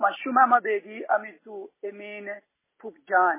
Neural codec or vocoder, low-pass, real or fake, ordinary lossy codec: none; 3.6 kHz; real; MP3, 16 kbps